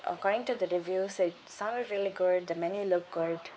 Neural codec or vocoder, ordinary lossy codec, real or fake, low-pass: codec, 16 kHz, 4 kbps, X-Codec, WavLM features, trained on Multilingual LibriSpeech; none; fake; none